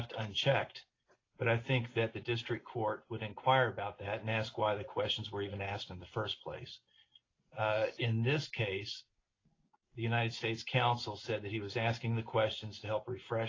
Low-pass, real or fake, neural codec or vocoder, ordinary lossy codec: 7.2 kHz; real; none; AAC, 32 kbps